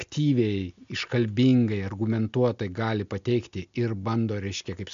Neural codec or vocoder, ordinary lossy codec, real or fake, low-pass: none; MP3, 64 kbps; real; 7.2 kHz